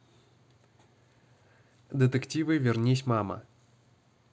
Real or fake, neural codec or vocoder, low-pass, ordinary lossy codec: real; none; none; none